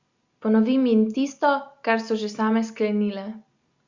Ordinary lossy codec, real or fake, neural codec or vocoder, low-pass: Opus, 64 kbps; real; none; 7.2 kHz